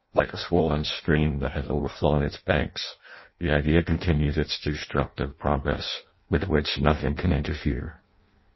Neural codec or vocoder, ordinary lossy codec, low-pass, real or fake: codec, 16 kHz in and 24 kHz out, 0.6 kbps, FireRedTTS-2 codec; MP3, 24 kbps; 7.2 kHz; fake